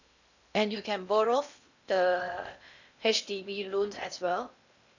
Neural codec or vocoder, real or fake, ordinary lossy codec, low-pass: codec, 16 kHz in and 24 kHz out, 0.8 kbps, FocalCodec, streaming, 65536 codes; fake; none; 7.2 kHz